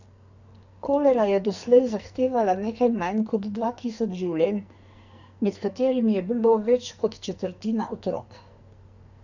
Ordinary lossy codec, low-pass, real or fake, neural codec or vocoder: none; 7.2 kHz; fake; codec, 44.1 kHz, 2.6 kbps, SNAC